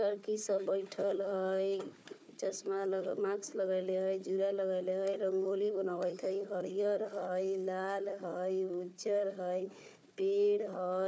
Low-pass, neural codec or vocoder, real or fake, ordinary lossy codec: none; codec, 16 kHz, 4 kbps, FunCodec, trained on Chinese and English, 50 frames a second; fake; none